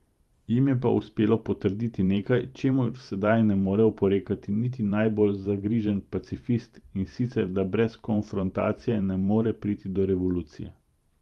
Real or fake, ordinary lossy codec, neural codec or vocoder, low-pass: fake; Opus, 32 kbps; vocoder, 44.1 kHz, 128 mel bands every 512 samples, BigVGAN v2; 19.8 kHz